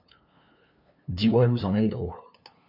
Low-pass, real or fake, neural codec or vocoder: 5.4 kHz; fake; codec, 16 kHz, 2 kbps, FunCodec, trained on LibriTTS, 25 frames a second